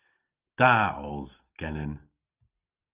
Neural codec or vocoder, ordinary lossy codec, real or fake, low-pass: none; Opus, 32 kbps; real; 3.6 kHz